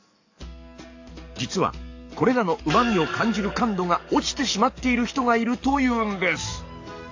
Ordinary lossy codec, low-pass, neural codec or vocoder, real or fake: AAC, 48 kbps; 7.2 kHz; codec, 44.1 kHz, 7.8 kbps, DAC; fake